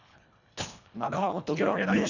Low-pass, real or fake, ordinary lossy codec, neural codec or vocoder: 7.2 kHz; fake; none; codec, 24 kHz, 1.5 kbps, HILCodec